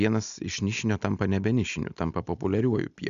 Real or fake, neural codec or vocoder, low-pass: real; none; 7.2 kHz